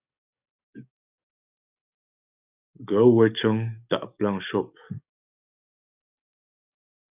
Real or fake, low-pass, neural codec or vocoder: fake; 3.6 kHz; codec, 44.1 kHz, 7.8 kbps, DAC